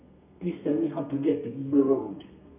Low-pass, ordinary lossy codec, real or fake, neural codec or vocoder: 3.6 kHz; none; fake; codec, 32 kHz, 1.9 kbps, SNAC